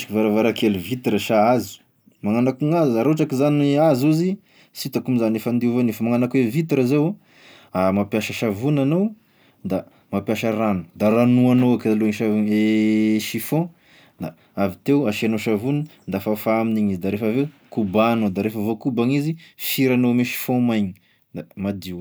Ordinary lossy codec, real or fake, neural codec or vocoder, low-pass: none; real; none; none